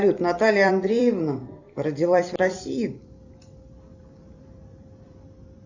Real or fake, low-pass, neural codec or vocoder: fake; 7.2 kHz; vocoder, 44.1 kHz, 128 mel bands every 256 samples, BigVGAN v2